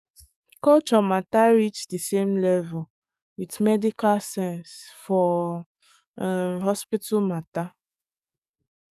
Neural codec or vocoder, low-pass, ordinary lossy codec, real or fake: codec, 44.1 kHz, 7.8 kbps, DAC; 14.4 kHz; none; fake